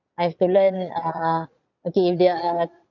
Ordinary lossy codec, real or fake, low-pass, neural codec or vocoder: none; fake; 7.2 kHz; vocoder, 22.05 kHz, 80 mel bands, Vocos